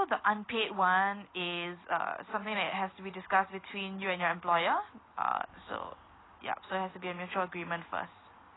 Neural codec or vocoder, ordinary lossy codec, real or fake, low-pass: none; AAC, 16 kbps; real; 7.2 kHz